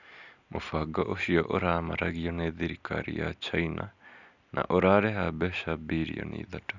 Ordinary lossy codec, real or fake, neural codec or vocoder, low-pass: none; real; none; 7.2 kHz